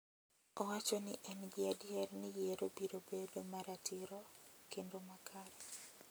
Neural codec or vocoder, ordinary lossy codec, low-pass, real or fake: none; none; none; real